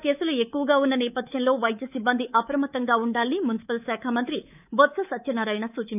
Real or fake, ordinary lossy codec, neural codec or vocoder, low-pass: fake; none; codec, 24 kHz, 3.1 kbps, DualCodec; 3.6 kHz